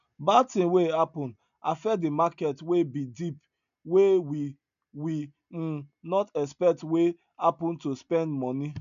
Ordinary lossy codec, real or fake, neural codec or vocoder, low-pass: none; real; none; 7.2 kHz